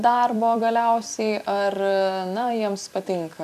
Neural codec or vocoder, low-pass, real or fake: vocoder, 44.1 kHz, 128 mel bands every 256 samples, BigVGAN v2; 14.4 kHz; fake